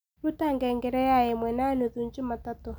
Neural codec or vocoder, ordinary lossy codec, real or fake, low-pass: none; none; real; none